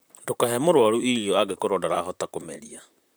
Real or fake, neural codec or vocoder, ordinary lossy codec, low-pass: fake; vocoder, 44.1 kHz, 128 mel bands, Pupu-Vocoder; none; none